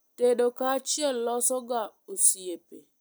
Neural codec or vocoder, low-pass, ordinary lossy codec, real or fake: none; none; none; real